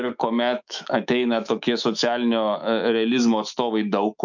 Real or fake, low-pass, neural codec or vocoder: fake; 7.2 kHz; autoencoder, 48 kHz, 128 numbers a frame, DAC-VAE, trained on Japanese speech